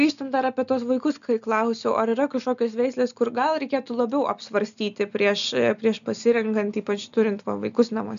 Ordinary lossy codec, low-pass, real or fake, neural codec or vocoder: MP3, 96 kbps; 7.2 kHz; real; none